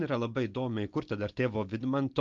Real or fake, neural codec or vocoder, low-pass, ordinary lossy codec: real; none; 7.2 kHz; Opus, 32 kbps